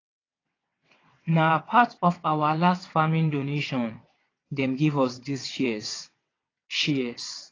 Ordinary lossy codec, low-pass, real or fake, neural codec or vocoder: AAC, 32 kbps; 7.2 kHz; fake; vocoder, 22.05 kHz, 80 mel bands, WaveNeXt